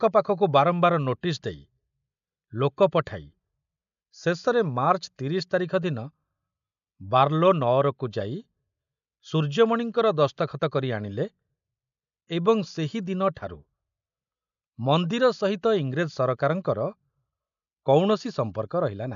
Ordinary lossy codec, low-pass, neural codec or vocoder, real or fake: none; 7.2 kHz; none; real